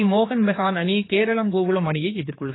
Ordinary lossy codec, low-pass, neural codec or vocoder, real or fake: AAC, 16 kbps; 7.2 kHz; codec, 16 kHz, 4 kbps, X-Codec, HuBERT features, trained on general audio; fake